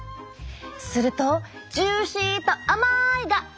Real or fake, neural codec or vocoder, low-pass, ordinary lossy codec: real; none; none; none